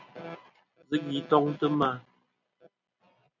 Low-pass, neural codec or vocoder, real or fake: 7.2 kHz; none; real